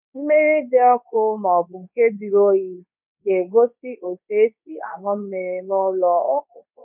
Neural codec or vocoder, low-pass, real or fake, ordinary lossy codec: codec, 24 kHz, 0.9 kbps, WavTokenizer, medium speech release version 2; 3.6 kHz; fake; none